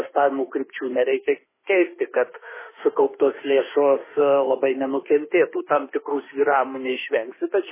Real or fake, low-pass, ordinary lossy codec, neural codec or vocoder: fake; 3.6 kHz; MP3, 16 kbps; autoencoder, 48 kHz, 32 numbers a frame, DAC-VAE, trained on Japanese speech